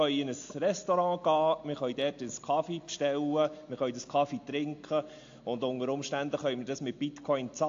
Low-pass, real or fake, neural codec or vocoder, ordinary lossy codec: 7.2 kHz; real; none; AAC, 48 kbps